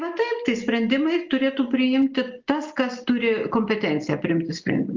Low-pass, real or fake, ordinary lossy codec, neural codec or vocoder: 7.2 kHz; real; Opus, 32 kbps; none